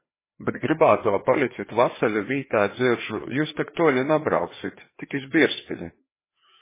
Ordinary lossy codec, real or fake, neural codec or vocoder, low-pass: MP3, 16 kbps; fake; codec, 16 kHz, 2 kbps, FreqCodec, larger model; 3.6 kHz